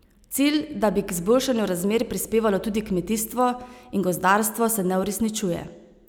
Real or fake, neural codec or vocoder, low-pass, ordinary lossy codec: real; none; none; none